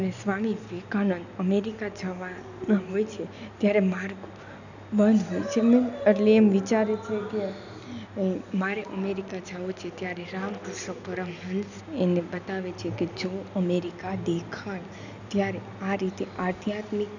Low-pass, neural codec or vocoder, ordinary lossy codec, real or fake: 7.2 kHz; none; none; real